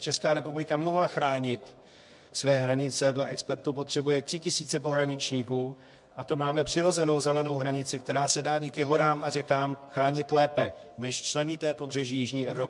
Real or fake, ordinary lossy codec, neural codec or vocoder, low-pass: fake; MP3, 64 kbps; codec, 24 kHz, 0.9 kbps, WavTokenizer, medium music audio release; 10.8 kHz